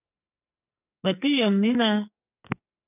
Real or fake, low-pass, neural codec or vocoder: fake; 3.6 kHz; codec, 44.1 kHz, 2.6 kbps, SNAC